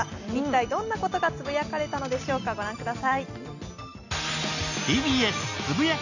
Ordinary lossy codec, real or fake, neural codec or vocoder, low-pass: none; real; none; 7.2 kHz